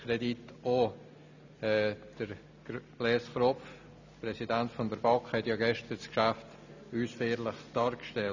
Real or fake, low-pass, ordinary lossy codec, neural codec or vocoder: real; 7.2 kHz; none; none